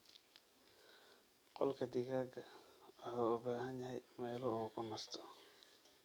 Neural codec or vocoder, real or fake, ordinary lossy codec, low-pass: codec, 44.1 kHz, 7.8 kbps, DAC; fake; none; none